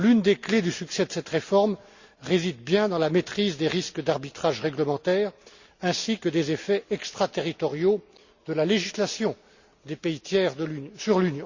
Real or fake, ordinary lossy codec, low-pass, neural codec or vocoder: real; Opus, 64 kbps; 7.2 kHz; none